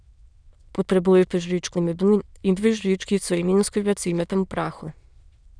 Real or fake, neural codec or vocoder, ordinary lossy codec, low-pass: fake; autoencoder, 22.05 kHz, a latent of 192 numbers a frame, VITS, trained on many speakers; none; 9.9 kHz